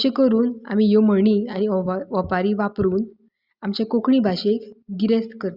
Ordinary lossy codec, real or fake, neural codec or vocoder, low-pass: Opus, 64 kbps; real; none; 5.4 kHz